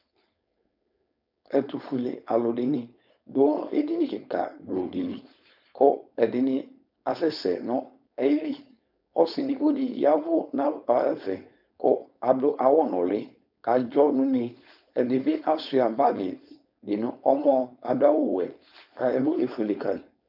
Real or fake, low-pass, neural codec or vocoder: fake; 5.4 kHz; codec, 16 kHz, 4.8 kbps, FACodec